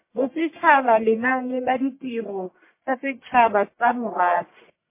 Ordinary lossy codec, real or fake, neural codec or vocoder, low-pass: MP3, 24 kbps; fake; codec, 44.1 kHz, 1.7 kbps, Pupu-Codec; 3.6 kHz